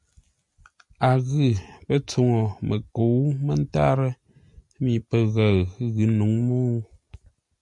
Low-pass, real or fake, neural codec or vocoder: 10.8 kHz; real; none